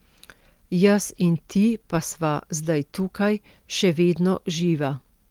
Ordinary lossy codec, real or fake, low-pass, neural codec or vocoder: Opus, 24 kbps; real; 19.8 kHz; none